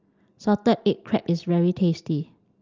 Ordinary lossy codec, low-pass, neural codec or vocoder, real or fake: Opus, 32 kbps; 7.2 kHz; none; real